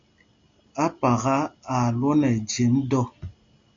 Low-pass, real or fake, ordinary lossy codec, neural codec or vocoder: 7.2 kHz; real; AAC, 48 kbps; none